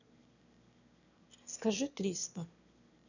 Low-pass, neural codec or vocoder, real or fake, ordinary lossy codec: 7.2 kHz; autoencoder, 22.05 kHz, a latent of 192 numbers a frame, VITS, trained on one speaker; fake; none